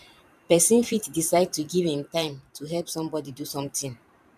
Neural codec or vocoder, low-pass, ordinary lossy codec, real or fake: vocoder, 44.1 kHz, 128 mel bands every 512 samples, BigVGAN v2; 14.4 kHz; none; fake